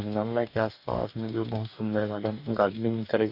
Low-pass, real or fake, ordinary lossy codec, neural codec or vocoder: 5.4 kHz; fake; none; codec, 44.1 kHz, 2.6 kbps, DAC